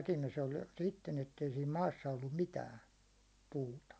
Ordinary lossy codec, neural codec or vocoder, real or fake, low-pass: none; none; real; none